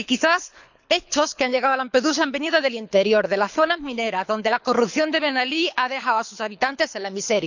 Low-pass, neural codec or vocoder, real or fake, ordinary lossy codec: 7.2 kHz; codec, 24 kHz, 6 kbps, HILCodec; fake; none